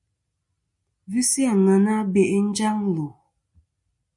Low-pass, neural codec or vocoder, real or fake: 10.8 kHz; none; real